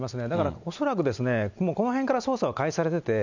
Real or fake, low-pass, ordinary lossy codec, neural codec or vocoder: real; 7.2 kHz; none; none